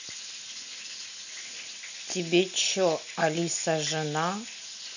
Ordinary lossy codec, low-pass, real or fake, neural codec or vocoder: none; 7.2 kHz; real; none